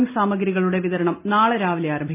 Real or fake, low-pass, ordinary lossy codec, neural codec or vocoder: real; 3.6 kHz; MP3, 24 kbps; none